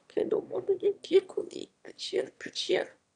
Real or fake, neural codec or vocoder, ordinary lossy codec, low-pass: fake; autoencoder, 22.05 kHz, a latent of 192 numbers a frame, VITS, trained on one speaker; none; 9.9 kHz